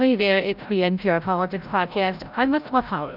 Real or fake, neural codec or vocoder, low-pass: fake; codec, 16 kHz, 0.5 kbps, FreqCodec, larger model; 5.4 kHz